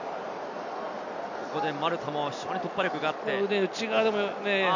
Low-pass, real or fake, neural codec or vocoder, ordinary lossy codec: 7.2 kHz; real; none; none